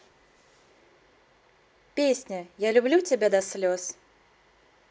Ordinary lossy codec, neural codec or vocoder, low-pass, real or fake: none; none; none; real